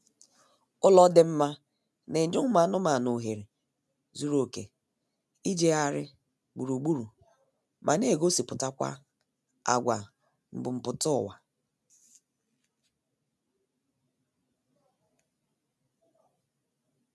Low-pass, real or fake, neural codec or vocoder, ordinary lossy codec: none; real; none; none